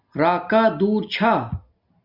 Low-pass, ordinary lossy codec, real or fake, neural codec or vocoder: 5.4 kHz; Opus, 64 kbps; real; none